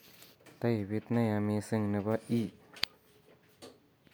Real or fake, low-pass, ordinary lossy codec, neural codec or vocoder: real; none; none; none